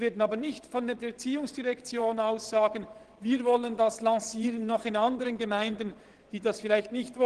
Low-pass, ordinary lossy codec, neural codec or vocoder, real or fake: 9.9 kHz; Opus, 16 kbps; vocoder, 22.05 kHz, 80 mel bands, WaveNeXt; fake